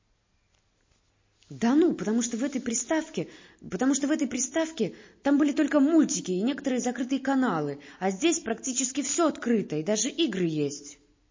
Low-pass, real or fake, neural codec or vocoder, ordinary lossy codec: 7.2 kHz; real; none; MP3, 32 kbps